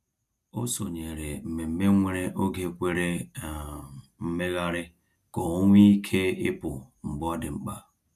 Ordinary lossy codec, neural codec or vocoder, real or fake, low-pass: none; none; real; 14.4 kHz